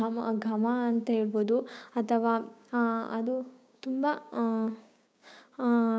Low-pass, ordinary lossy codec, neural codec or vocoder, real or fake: none; none; codec, 16 kHz, 6 kbps, DAC; fake